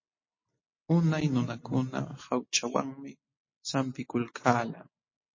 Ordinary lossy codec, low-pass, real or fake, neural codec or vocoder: MP3, 32 kbps; 7.2 kHz; real; none